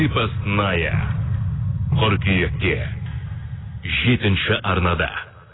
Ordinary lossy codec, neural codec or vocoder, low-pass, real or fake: AAC, 16 kbps; none; 7.2 kHz; real